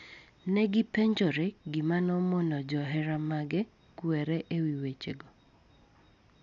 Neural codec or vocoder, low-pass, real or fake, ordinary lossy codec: none; 7.2 kHz; real; none